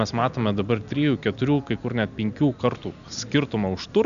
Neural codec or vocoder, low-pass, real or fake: none; 7.2 kHz; real